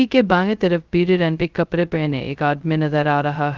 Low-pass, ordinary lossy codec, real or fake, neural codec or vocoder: 7.2 kHz; Opus, 32 kbps; fake; codec, 16 kHz, 0.2 kbps, FocalCodec